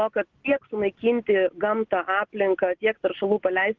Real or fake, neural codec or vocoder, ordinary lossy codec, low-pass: real; none; Opus, 16 kbps; 7.2 kHz